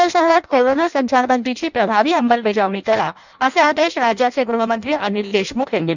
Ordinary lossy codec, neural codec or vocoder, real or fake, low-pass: none; codec, 16 kHz in and 24 kHz out, 0.6 kbps, FireRedTTS-2 codec; fake; 7.2 kHz